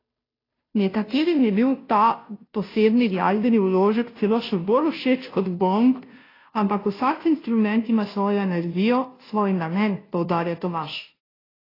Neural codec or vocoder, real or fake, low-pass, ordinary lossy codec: codec, 16 kHz, 0.5 kbps, FunCodec, trained on Chinese and English, 25 frames a second; fake; 5.4 kHz; AAC, 24 kbps